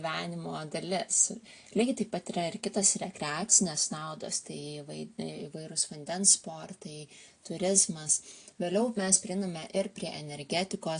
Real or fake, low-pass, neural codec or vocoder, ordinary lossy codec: real; 9.9 kHz; none; AAC, 48 kbps